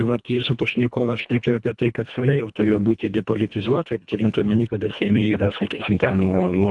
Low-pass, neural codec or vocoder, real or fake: 10.8 kHz; codec, 24 kHz, 1.5 kbps, HILCodec; fake